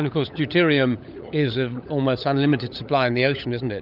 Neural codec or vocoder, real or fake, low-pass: codec, 16 kHz, 16 kbps, FunCodec, trained on LibriTTS, 50 frames a second; fake; 5.4 kHz